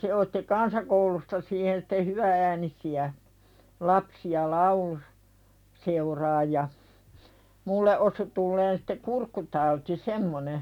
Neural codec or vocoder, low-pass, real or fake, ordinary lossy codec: none; 19.8 kHz; real; none